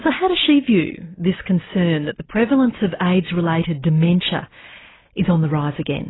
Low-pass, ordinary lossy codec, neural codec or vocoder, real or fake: 7.2 kHz; AAC, 16 kbps; none; real